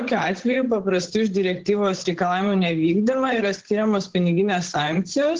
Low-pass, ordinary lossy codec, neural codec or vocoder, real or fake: 7.2 kHz; Opus, 16 kbps; codec, 16 kHz, 8 kbps, FunCodec, trained on Chinese and English, 25 frames a second; fake